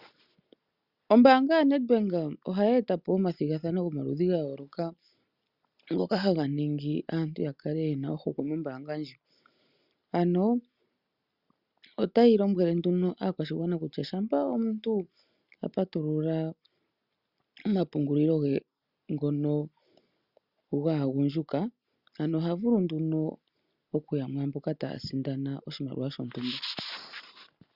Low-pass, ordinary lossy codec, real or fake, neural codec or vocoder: 5.4 kHz; Opus, 64 kbps; real; none